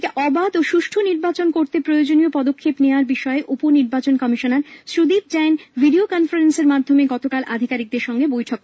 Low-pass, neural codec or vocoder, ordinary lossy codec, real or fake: none; none; none; real